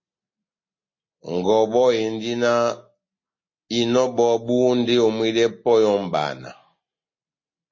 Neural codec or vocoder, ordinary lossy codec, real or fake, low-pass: none; MP3, 32 kbps; real; 7.2 kHz